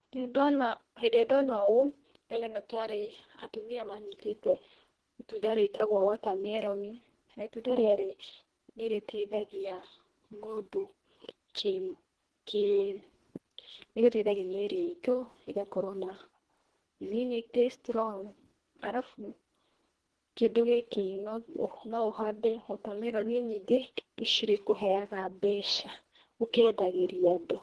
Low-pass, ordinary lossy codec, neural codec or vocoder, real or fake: 10.8 kHz; Opus, 16 kbps; codec, 24 kHz, 1.5 kbps, HILCodec; fake